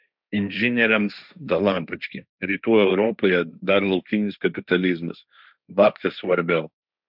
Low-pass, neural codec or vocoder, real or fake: 5.4 kHz; codec, 16 kHz, 1.1 kbps, Voila-Tokenizer; fake